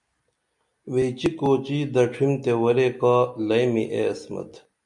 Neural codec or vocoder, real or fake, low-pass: none; real; 10.8 kHz